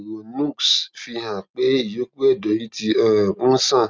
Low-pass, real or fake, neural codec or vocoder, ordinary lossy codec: none; real; none; none